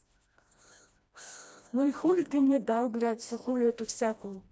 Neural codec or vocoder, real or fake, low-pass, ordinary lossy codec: codec, 16 kHz, 1 kbps, FreqCodec, smaller model; fake; none; none